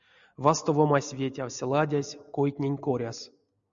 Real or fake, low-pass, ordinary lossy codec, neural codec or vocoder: real; 7.2 kHz; MP3, 96 kbps; none